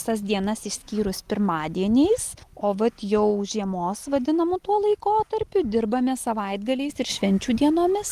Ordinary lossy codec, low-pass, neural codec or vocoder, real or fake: Opus, 24 kbps; 14.4 kHz; none; real